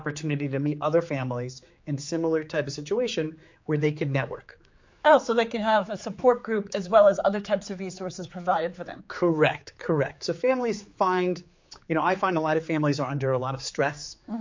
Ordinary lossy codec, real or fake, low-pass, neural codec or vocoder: MP3, 48 kbps; fake; 7.2 kHz; codec, 16 kHz, 4 kbps, X-Codec, HuBERT features, trained on general audio